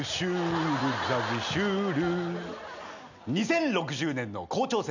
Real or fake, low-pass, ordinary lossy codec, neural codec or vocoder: real; 7.2 kHz; none; none